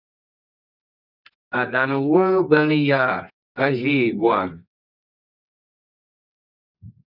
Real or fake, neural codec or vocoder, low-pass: fake; codec, 24 kHz, 0.9 kbps, WavTokenizer, medium music audio release; 5.4 kHz